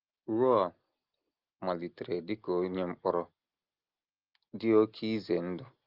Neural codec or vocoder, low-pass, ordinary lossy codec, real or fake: none; 5.4 kHz; Opus, 24 kbps; real